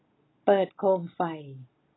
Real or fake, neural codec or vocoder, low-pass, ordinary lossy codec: real; none; 7.2 kHz; AAC, 16 kbps